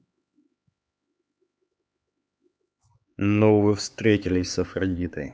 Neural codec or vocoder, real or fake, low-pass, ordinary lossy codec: codec, 16 kHz, 4 kbps, X-Codec, HuBERT features, trained on LibriSpeech; fake; none; none